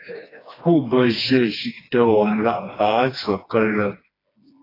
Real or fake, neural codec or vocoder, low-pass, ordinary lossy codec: fake; codec, 16 kHz, 2 kbps, FreqCodec, smaller model; 5.4 kHz; AAC, 24 kbps